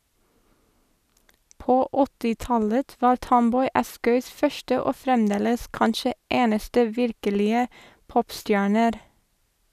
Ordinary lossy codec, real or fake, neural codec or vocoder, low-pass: none; real; none; 14.4 kHz